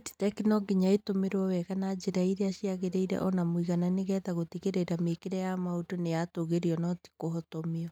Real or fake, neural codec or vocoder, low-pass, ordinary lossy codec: real; none; 19.8 kHz; none